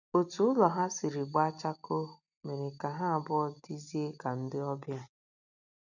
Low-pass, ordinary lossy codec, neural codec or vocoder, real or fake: 7.2 kHz; none; none; real